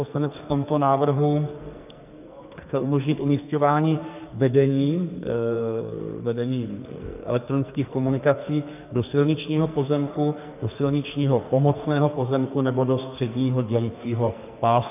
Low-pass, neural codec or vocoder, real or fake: 3.6 kHz; codec, 44.1 kHz, 2.6 kbps, SNAC; fake